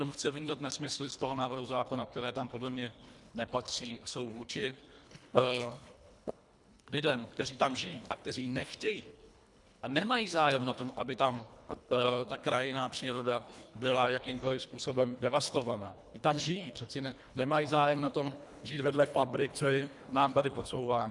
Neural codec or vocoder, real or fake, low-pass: codec, 24 kHz, 1.5 kbps, HILCodec; fake; 10.8 kHz